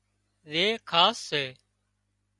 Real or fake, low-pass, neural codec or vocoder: real; 10.8 kHz; none